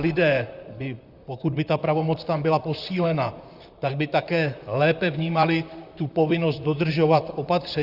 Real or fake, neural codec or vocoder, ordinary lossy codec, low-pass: fake; vocoder, 44.1 kHz, 128 mel bands, Pupu-Vocoder; Opus, 64 kbps; 5.4 kHz